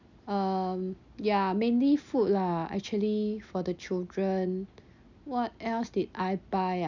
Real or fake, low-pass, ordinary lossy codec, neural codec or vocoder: real; 7.2 kHz; none; none